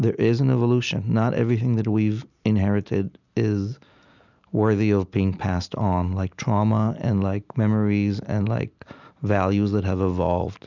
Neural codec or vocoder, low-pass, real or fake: none; 7.2 kHz; real